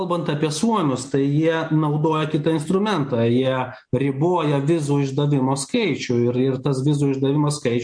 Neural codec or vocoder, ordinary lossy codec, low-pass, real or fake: none; MP3, 48 kbps; 9.9 kHz; real